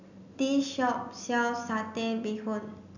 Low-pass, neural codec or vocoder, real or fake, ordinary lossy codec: 7.2 kHz; none; real; none